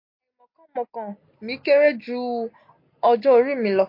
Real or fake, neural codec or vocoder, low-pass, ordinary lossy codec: real; none; 5.4 kHz; MP3, 32 kbps